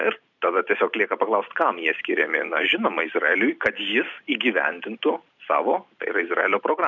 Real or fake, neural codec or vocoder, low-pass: real; none; 7.2 kHz